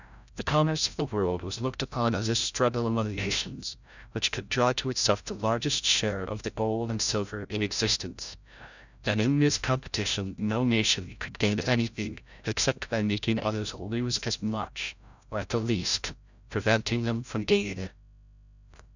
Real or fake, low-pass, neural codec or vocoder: fake; 7.2 kHz; codec, 16 kHz, 0.5 kbps, FreqCodec, larger model